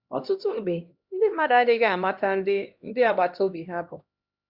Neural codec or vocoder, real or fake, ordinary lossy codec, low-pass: codec, 16 kHz, 1 kbps, X-Codec, HuBERT features, trained on LibriSpeech; fake; Opus, 64 kbps; 5.4 kHz